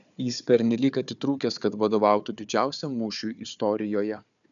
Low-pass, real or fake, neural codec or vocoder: 7.2 kHz; fake; codec, 16 kHz, 4 kbps, FunCodec, trained on Chinese and English, 50 frames a second